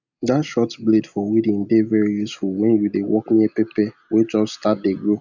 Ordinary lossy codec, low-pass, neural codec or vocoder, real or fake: none; 7.2 kHz; none; real